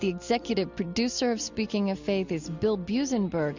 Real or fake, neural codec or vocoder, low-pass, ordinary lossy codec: real; none; 7.2 kHz; Opus, 64 kbps